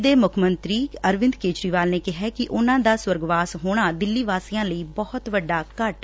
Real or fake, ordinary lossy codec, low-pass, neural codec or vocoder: real; none; none; none